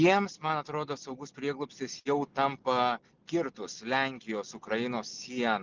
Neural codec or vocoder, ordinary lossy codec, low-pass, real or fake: none; Opus, 24 kbps; 7.2 kHz; real